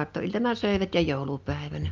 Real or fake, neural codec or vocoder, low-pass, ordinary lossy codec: real; none; 7.2 kHz; Opus, 24 kbps